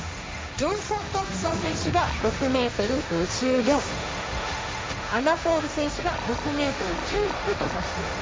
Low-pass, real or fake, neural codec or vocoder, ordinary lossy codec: none; fake; codec, 16 kHz, 1.1 kbps, Voila-Tokenizer; none